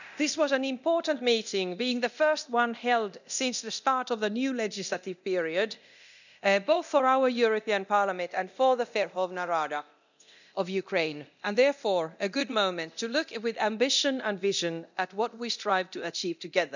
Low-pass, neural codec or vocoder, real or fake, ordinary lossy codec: 7.2 kHz; codec, 24 kHz, 0.9 kbps, DualCodec; fake; none